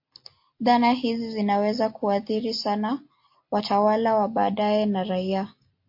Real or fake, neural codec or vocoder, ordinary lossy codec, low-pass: real; none; MP3, 32 kbps; 5.4 kHz